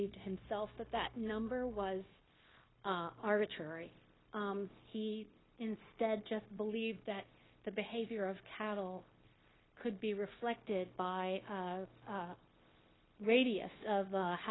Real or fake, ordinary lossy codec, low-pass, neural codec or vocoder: fake; AAC, 16 kbps; 7.2 kHz; codec, 16 kHz, 0.9 kbps, LongCat-Audio-Codec